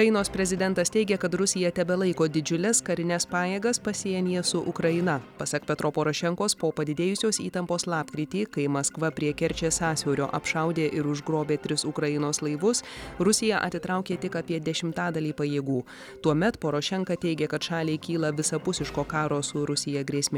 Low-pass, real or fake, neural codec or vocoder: 19.8 kHz; real; none